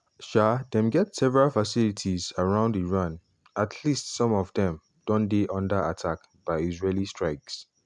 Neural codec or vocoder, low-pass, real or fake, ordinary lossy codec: none; 10.8 kHz; real; none